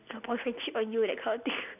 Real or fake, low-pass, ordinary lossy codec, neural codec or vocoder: real; 3.6 kHz; none; none